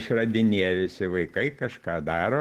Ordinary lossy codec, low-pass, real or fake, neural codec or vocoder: Opus, 16 kbps; 14.4 kHz; real; none